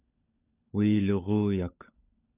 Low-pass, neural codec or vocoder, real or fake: 3.6 kHz; codec, 16 kHz, 16 kbps, FunCodec, trained on LibriTTS, 50 frames a second; fake